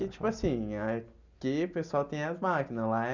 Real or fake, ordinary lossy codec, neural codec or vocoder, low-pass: real; none; none; 7.2 kHz